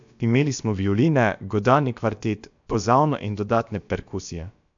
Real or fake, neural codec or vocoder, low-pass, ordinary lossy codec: fake; codec, 16 kHz, about 1 kbps, DyCAST, with the encoder's durations; 7.2 kHz; MP3, 64 kbps